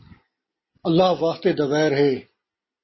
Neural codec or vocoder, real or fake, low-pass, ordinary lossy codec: none; real; 7.2 kHz; MP3, 24 kbps